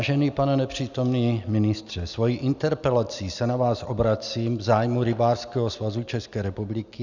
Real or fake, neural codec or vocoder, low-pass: real; none; 7.2 kHz